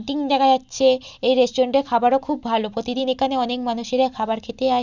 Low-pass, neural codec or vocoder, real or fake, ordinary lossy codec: 7.2 kHz; none; real; none